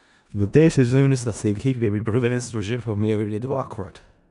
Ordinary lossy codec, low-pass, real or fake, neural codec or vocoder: none; 10.8 kHz; fake; codec, 16 kHz in and 24 kHz out, 0.4 kbps, LongCat-Audio-Codec, four codebook decoder